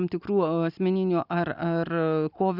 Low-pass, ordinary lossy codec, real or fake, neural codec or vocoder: 5.4 kHz; Opus, 64 kbps; real; none